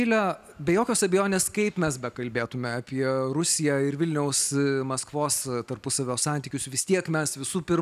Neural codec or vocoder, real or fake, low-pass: none; real; 14.4 kHz